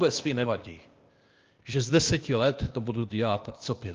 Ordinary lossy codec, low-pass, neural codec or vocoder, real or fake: Opus, 32 kbps; 7.2 kHz; codec, 16 kHz, 0.8 kbps, ZipCodec; fake